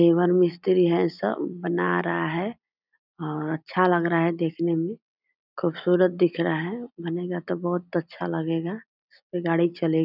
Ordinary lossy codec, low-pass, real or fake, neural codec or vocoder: none; 5.4 kHz; real; none